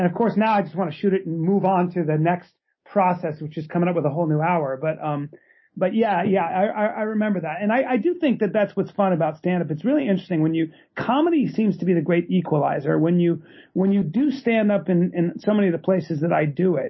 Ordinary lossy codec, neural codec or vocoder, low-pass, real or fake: MP3, 24 kbps; none; 7.2 kHz; real